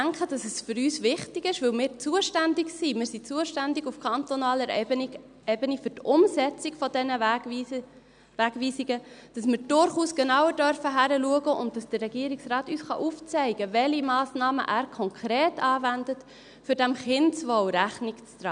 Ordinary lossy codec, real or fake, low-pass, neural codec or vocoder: none; real; 9.9 kHz; none